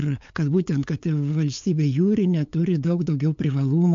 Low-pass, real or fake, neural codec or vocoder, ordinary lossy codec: 7.2 kHz; fake; codec, 16 kHz, 8 kbps, FunCodec, trained on LibriTTS, 25 frames a second; MP3, 48 kbps